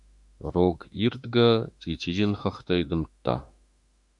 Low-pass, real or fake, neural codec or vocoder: 10.8 kHz; fake; autoencoder, 48 kHz, 32 numbers a frame, DAC-VAE, trained on Japanese speech